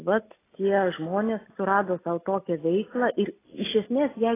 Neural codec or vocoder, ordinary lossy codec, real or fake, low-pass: none; AAC, 16 kbps; real; 3.6 kHz